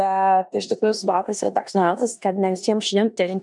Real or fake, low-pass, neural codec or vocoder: fake; 10.8 kHz; codec, 16 kHz in and 24 kHz out, 0.9 kbps, LongCat-Audio-Codec, four codebook decoder